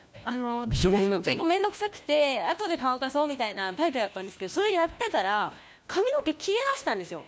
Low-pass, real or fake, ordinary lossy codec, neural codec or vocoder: none; fake; none; codec, 16 kHz, 1 kbps, FunCodec, trained on LibriTTS, 50 frames a second